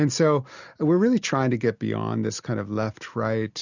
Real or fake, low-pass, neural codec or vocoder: real; 7.2 kHz; none